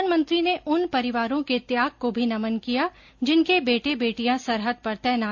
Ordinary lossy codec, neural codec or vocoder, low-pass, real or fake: AAC, 48 kbps; none; 7.2 kHz; real